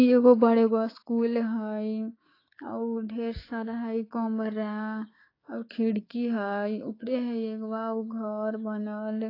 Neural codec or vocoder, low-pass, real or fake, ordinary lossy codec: codec, 16 kHz, 6 kbps, DAC; 5.4 kHz; fake; AAC, 24 kbps